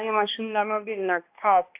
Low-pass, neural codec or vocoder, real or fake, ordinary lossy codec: 3.6 kHz; codec, 16 kHz, 1 kbps, X-Codec, HuBERT features, trained on balanced general audio; fake; AAC, 32 kbps